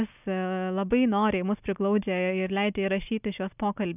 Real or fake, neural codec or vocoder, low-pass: real; none; 3.6 kHz